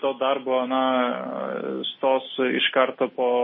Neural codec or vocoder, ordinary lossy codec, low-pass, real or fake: none; MP3, 24 kbps; 7.2 kHz; real